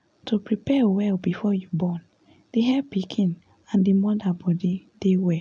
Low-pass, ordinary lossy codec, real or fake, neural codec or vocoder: 9.9 kHz; none; real; none